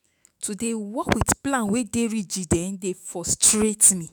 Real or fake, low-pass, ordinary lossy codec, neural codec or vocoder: fake; none; none; autoencoder, 48 kHz, 128 numbers a frame, DAC-VAE, trained on Japanese speech